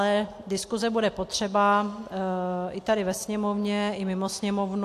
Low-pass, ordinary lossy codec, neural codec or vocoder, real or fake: 14.4 kHz; AAC, 64 kbps; none; real